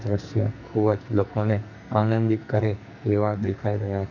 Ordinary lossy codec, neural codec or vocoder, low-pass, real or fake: none; codec, 44.1 kHz, 2.6 kbps, SNAC; 7.2 kHz; fake